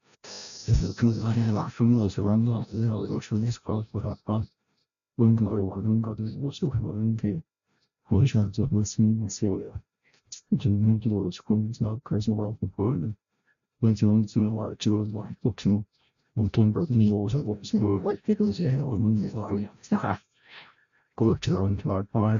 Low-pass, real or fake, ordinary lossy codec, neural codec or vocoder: 7.2 kHz; fake; MP3, 96 kbps; codec, 16 kHz, 0.5 kbps, FreqCodec, larger model